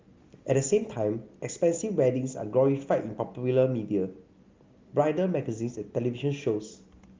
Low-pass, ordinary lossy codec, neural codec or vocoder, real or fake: 7.2 kHz; Opus, 32 kbps; none; real